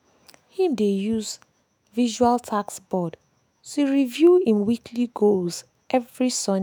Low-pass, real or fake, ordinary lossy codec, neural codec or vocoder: none; fake; none; autoencoder, 48 kHz, 128 numbers a frame, DAC-VAE, trained on Japanese speech